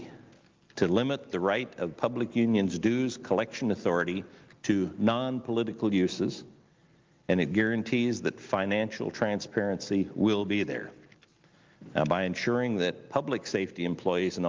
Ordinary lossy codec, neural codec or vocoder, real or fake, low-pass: Opus, 32 kbps; none; real; 7.2 kHz